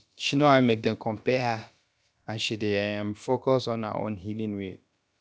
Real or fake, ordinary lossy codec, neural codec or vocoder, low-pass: fake; none; codec, 16 kHz, about 1 kbps, DyCAST, with the encoder's durations; none